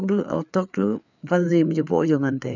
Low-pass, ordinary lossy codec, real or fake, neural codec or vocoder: 7.2 kHz; none; fake; codec, 16 kHz, 4 kbps, FreqCodec, larger model